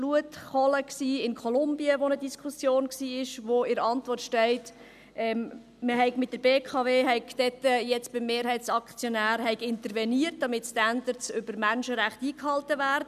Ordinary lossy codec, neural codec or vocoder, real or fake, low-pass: none; none; real; 14.4 kHz